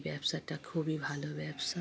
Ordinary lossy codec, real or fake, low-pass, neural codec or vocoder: none; real; none; none